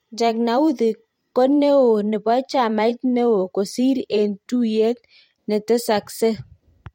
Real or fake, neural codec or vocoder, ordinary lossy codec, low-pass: fake; vocoder, 44.1 kHz, 128 mel bands every 512 samples, BigVGAN v2; MP3, 64 kbps; 19.8 kHz